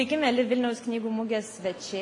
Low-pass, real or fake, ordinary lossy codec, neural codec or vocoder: 10.8 kHz; real; AAC, 64 kbps; none